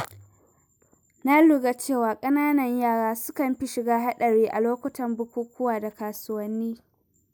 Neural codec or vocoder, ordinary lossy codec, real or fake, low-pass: none; none; real; none